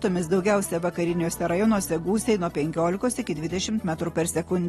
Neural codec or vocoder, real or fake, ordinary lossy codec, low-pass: none; real; AAC, 32 kbps; 19.8 kHz